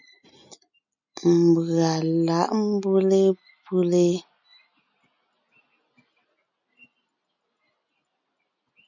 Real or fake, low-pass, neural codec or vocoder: real; 7.2 kHz; none